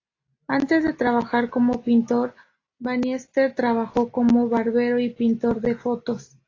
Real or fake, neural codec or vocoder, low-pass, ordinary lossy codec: real; none; 7.2 kHz; AAC, 32 kbps